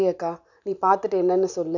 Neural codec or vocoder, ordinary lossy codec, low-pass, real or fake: none; none; 7.2 kHz; real